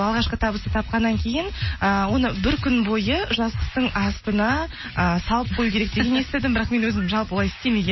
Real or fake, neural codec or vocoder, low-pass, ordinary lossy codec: real; none; 7.2 kHz; MP3, 24 kbps